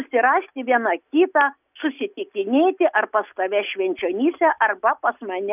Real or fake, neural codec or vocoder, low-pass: real; none; 3.6 kHz